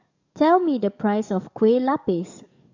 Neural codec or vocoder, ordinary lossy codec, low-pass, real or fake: codec, 44.1 kHz, 7.8 kbps, DAC; none; 7.2 kHz; fake